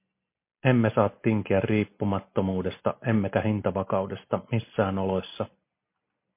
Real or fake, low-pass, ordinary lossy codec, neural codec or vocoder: real; 3.6 kHz; MP3, 24 kbps; none